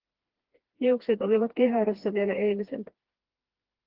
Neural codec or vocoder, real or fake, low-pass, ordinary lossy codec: codec, 16 kHz, 2 kbps, FreqCodec, smaller model; fake; 5.4 kHz; Opus, 16 kbps